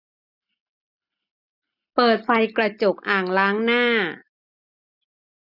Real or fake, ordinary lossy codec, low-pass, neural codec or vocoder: real; none; 5.4 kHz; none